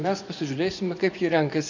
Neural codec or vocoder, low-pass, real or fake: vocoder, 24 kHz, 100 mel bands, Vocos; 7.2 kHz; fake